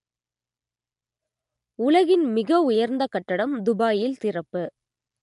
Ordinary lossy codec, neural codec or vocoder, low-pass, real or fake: MP3, 64 kbps; none; 10.8 kHz; real